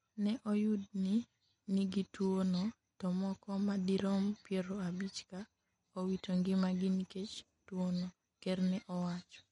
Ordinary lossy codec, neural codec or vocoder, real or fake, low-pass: MP3, 48 kbps; none; real; 19.8 kHz